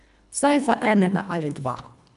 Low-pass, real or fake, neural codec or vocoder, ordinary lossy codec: 10.8 kHz; fake; codec, 24 kHz, 1.5 kbps, HILCodec; none